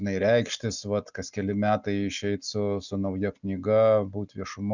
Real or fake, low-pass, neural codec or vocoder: real; 7.2 kHz; none